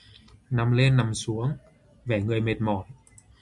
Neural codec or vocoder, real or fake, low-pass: none; real; 10.8 kHz